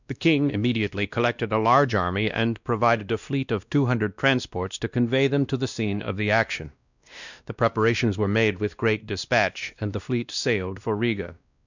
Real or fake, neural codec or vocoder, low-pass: fake; codec, 16 kHz, 1 kbps, X-Codec, WavLM features, trained on Multilingual LibriSpeech; 7.2 kHz